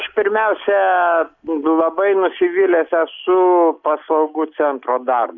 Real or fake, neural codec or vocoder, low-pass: real; none; 7.2 kHz